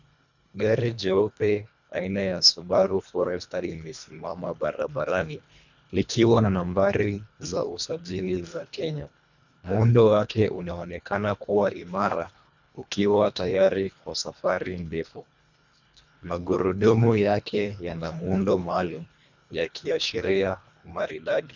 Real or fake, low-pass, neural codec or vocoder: fake; 7.2 kHz; codec, 24 kHz, 1.5 kbps, HILCodec